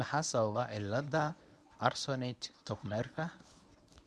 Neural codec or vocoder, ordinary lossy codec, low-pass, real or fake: codec, 24 kHz, 0.9 kbps, WavTokenizer, medium speech release version 1; none; none; fake